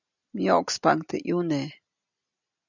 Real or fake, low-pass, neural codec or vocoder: real; 7.2 kHz; none